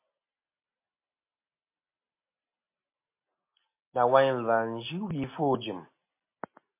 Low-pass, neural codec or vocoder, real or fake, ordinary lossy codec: 3.6 kHz; none; real; MP3, 24 kbps